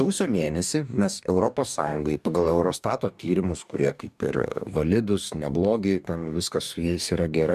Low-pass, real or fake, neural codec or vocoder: 14.4 kHz; fake; codec, 44.1 kHz, 2.6 kbps, DAC